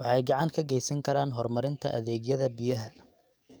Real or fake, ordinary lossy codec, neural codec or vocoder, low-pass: fake; none; codec, 44.1 kHz, 7.8 kbps, DAC; none